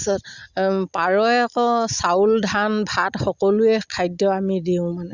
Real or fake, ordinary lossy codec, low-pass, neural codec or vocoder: real; Opus, 64 kbps; 7.2 kHz; none